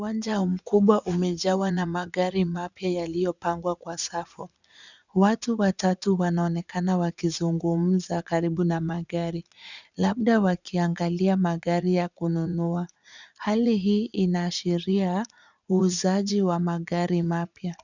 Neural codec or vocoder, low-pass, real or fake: vocoder, 44.1 kHz, 80 mel bands, Vocos; 7.2 kHz; fake